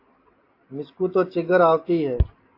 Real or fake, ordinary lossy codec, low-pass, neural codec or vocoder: real; AAC, 32 kbps; 5.4 kHz; none